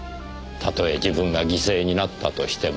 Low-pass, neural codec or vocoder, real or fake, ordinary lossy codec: none; none; real; none